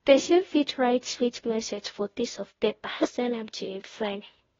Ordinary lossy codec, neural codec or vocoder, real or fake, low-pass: AAC, 24 kbps; codec, 16 kHz, 0.5 kbps, FunCodec, trained on Chinese and English, 25 frames a second; fake; 7.2 kHz